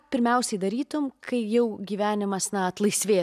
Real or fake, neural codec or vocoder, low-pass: real; none; 14.4 kHz